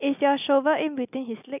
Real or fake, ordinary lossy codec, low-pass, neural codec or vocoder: real; none; 3.6 kHz; none